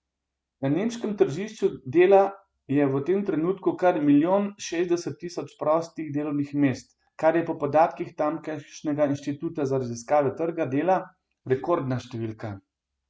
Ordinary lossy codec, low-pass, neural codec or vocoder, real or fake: none; none; none; real